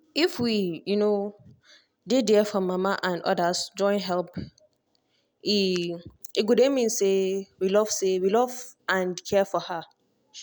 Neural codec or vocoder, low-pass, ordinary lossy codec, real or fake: none; none; none; real